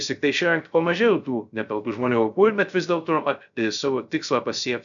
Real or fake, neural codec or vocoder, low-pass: fake; codec, 16 kHz, 0.3 kbps, FocalCodec; 7.2 kHz